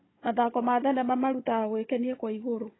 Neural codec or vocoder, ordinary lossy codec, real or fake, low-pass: codec, 16 kHz, 16 kbps, FunCodec, trained on Chinese and English, 50 frames a second; AAC, 16 kbps; fake; 7.2 kHz